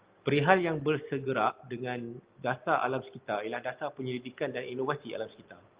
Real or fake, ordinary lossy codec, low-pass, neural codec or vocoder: real; Opus, 32 kbps; 3.6 kHz; none